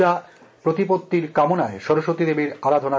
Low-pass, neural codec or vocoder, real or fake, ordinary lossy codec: none; none; real; none